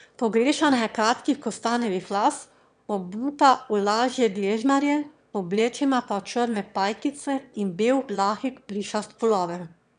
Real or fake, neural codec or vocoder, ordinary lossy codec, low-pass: fake; autoencoder, 22.05 kHz, a latent of 192 numbers a frame, VITS, trained on one speaker; none; 9.9 kHz